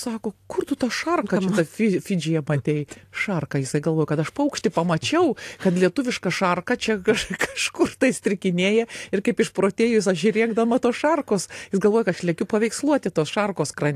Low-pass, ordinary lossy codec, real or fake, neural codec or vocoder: 14.4 kHz; AAC, 64 kbps; real; none